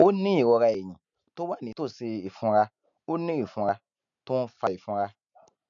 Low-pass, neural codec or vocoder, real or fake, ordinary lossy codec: 7.2 kHz; none; real; none